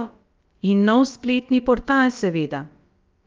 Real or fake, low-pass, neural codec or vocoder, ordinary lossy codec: fake; 7.2 kHz; codec, 16 kHz, about 1 kbps, DyCAST, with the encoder's durations; Opus, 32 kbps